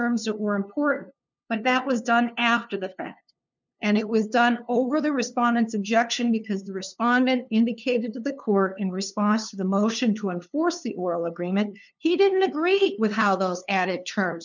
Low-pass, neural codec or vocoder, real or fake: 7.2 kHz; codec, 16 kHz, 2 kbps, FunCodec, trained on LibriTTS, 25 frames a second; fake